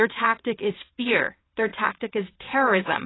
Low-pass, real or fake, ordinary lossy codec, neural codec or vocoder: 7.2 kHz; fake; AAC, 16 kbps; vocoder, 22.05 kHz, 80 mel bands, WaveNeXt